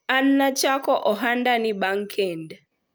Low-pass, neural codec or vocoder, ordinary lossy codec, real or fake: none; none; none; real